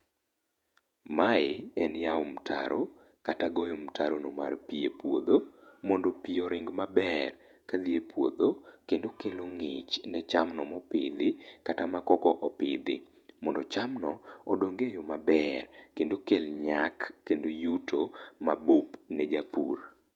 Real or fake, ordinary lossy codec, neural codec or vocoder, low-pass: fake; none; vocoder, 48 kHz, 128 mel bands, Vocos; 19.8 kHz